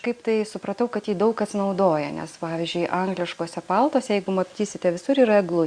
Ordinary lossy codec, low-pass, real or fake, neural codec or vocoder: Opus, 64 kbps; 9.9 kHz; real; none